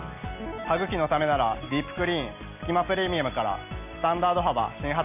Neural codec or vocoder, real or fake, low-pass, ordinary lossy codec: none; real; 3.6 kHz; none